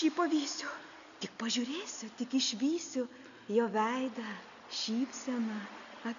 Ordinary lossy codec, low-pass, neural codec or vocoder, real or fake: MP3, 96 kbps; 7.2 kHz; none; real